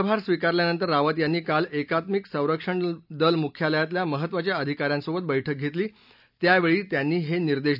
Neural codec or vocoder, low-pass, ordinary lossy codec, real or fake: none; 5.4 kHz; none; real